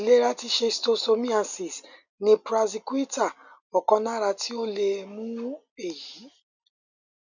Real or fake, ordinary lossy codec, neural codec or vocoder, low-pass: real; none; none; 7.2 kHz